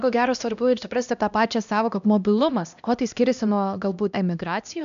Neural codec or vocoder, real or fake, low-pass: codec, 16 kHz, 1 kbps, X-Codec, HuBERT features, trained on LibriSpeech; fake; 7.2 kHz